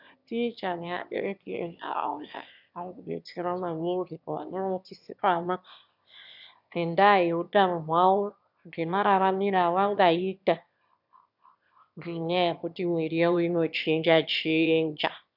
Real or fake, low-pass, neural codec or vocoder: fake; 5.4 kHz; autoencoder, 22.05 kHz, a latent of 192 numbers a frame, VITS, trained on one speaker